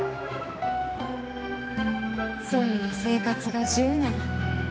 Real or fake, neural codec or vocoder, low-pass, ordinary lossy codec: fake; codec, 16 kHz, 2 kbps, X-Codec, HuBERT features, trained on general audio; none; none